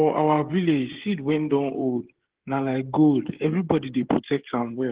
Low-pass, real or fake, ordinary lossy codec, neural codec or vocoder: 3.6 kHz; fake; Opus, 16 kbps; codec, 16 kHz, 16 kbps, FreqCodec, smaller model